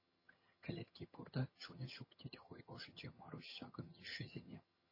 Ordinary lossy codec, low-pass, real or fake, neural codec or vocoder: MP3, 24 kbps; 5.4 kHz; fake; vocoder, 22.05 kHz, 80 mel bands, HiFi-GAN